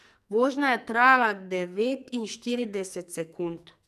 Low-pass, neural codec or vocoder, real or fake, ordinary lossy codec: 14.4 kHz; codec, 44.1 kHz, 2.6 kbps, SNAC; fake; none